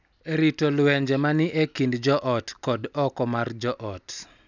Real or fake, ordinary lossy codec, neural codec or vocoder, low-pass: real; none; none; 7.2 kHz